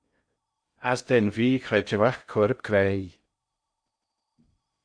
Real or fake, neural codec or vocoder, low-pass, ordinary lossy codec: fake; codec, 16 kHz in and 24 kHz out, 0.6 kbps, FocalCodec, streaming, 4096 codes; 9.9 kHz; AAC, 64 kbps